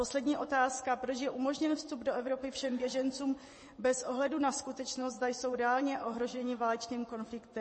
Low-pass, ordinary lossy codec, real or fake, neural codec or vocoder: 10.8 kHz; MP3, 32 kbps; fake; vocoder, 24 kHz, 100 mel bands, Vocos